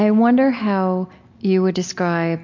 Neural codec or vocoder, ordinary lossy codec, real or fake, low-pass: none; MP3, 48 kbps; real; 7.2 kHz